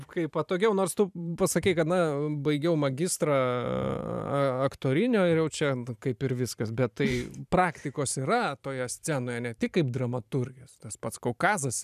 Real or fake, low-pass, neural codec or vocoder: fake; 14.4 kHz; vocoder, 44.1 kHz, 128 mel bands every 512 samples, BigVGAN v2